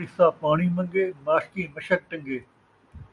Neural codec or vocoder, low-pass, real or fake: none; 10.8 kHz; real